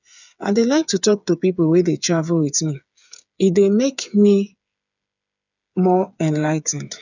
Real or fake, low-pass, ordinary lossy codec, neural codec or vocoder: fake; 7.2 kHz; none; codec, 16 kHz, 8 kbps, FreqCodec, smaller model